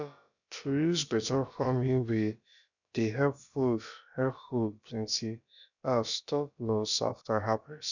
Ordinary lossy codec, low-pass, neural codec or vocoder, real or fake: none; 7.2 kHz; codec, 16 kHz, about 1 kbps, DyCAST, with the encoder's durations; fake